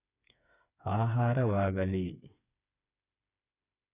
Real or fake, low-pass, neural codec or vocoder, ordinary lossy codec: fake; 3.6 kHz; codec, 16 kHz, 4 kbps, FreqCodec, smaller model; none